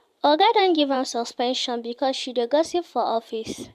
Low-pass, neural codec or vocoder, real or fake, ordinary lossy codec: 14.4 kHz; vocoder, 44.1 kHz, 128 mel bands every 512 samples, BigVGAN v2; fake; MP3, 96 kbps